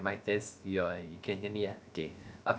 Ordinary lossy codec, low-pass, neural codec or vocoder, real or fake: none; none; codec, 16 kHz, about 1 kbps, DyCAST, with the encoder's durations; fake